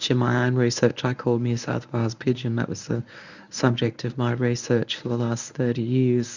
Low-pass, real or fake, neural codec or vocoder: 7.2 kHz; fake; codec, 24 kHz, 0.9 kbps, WavTokenizer, medium speech release version 1